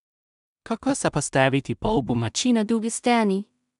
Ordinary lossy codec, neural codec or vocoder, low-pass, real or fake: MP3, 96 kbps; codec, 16 kHz in and 24 kHz out, 0.4 kbps, LongCat-Audio-Codec, two codebook decoder; 10.8 kHz; fake